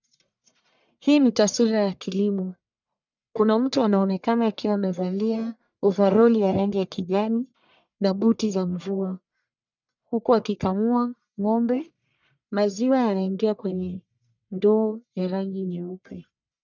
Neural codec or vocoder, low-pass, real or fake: codec, 44.1 kHz, 1.7 kbps, Pupu-Codec; 7.2 kHz; fake